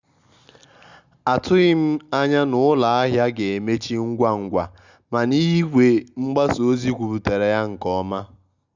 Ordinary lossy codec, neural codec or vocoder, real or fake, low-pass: Opus, 64 kbps; none; real; 7.2 kHz